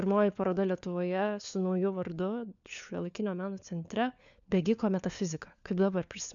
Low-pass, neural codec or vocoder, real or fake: 7.2 kHz; codec, 16 kHz, 4 kbps, FunCodec, trained on LibriTTS, 50 frames a second; fake